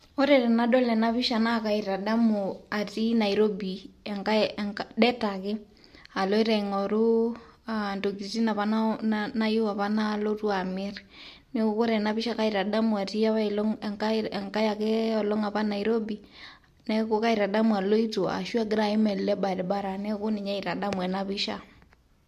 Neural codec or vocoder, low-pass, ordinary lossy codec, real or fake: none; 14.4 kHz; MP3, 64 kbps; real